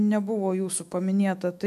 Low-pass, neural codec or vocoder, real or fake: 14.4 kHz; autoencoder, 48 kHz, 128 numbers a frame, DAC-VAE, trained on Japanese speech; fake